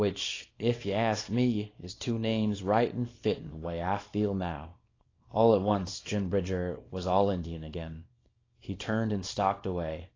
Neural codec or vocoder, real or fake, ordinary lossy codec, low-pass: codec, 24 kHz, 0.9 kbps, WavTokenizer, small release; fake; AAC, 32 kbps; 7.2 kHz